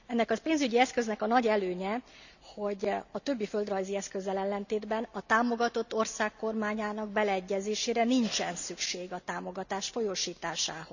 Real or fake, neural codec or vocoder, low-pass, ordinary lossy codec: real; none; 7.2 kHz; none